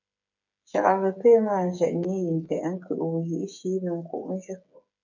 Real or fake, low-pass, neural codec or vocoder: fake; 7.2 kHz; codec, 16 kHz, 8 kbps, FreqCodec, smaller model